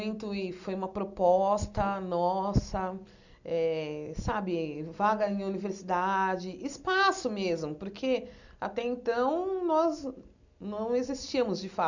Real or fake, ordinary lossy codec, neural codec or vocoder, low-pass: real; none; none; 7.2 kHz